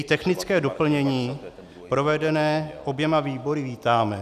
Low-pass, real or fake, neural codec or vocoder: 14.4 kHz; real; none